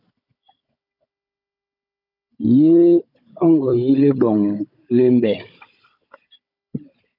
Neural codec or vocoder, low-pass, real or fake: codec, 16 kHz, 16 kbps, FunCodec, trained on Chinese and English, 50 frames a second; 5.4 kHz; fake